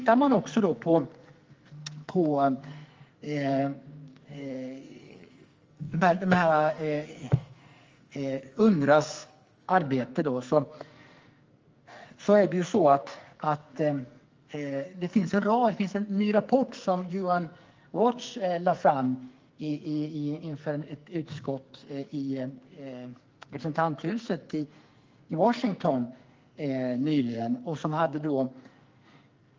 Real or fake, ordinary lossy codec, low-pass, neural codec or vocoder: fake; Opus, 32 kbps; 7.2 kHz; codec, 44.1 kHz, 2.6 kbps, SNAC